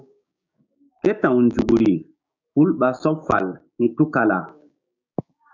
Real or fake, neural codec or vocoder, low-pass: fake; codec, 16 kHz, 6 kbps, DAC; 7.2 kHz